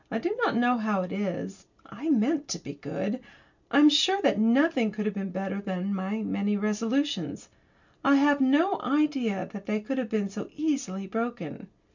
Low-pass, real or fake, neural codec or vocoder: 7.2 kHz; real; none